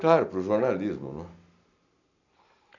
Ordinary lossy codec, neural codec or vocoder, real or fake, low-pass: none; none; real; 7.2 kHz